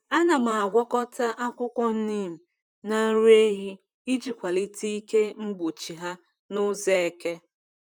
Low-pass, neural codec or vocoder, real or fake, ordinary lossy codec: 19.8 kHz; vocoder, 44.1 kHz, 128 mel bands, Pupu-Vocoder; fake; none